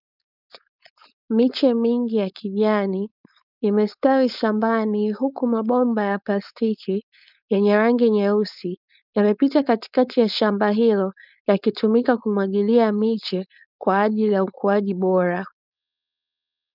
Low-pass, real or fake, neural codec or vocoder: 5.4 kHz; fake; codec, 16 kHz, 4.8 kbps, FACodec